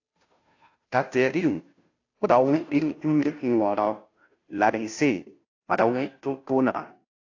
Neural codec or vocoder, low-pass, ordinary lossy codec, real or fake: codec, 16 kHz, 0.5 kbps, FunCodec, trained on Chinese and English, 25 frames a second; 7.2 kHz; AAC, 48 kbps; fake